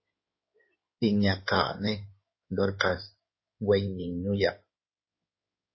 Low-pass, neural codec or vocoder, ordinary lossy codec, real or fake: 7.2 kHz; codec, 16 kHz in and 24 kHz out, 2.2 kbps, FireRedTTS-2 codec; MP3, 24 kbps; fake